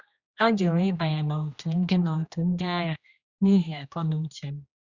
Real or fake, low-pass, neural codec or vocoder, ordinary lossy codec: fake; 7.2 kHz; codec, 16 kHz, 1 kbps, X-Codec, HuBERT features, trained on general audio; Opus, 64 kbps